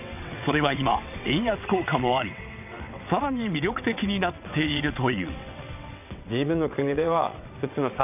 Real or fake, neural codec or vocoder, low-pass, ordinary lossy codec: fake; vocoder, 22.05 kHz, 80 mel bands, WaveNeXt; 3.6 kHz; none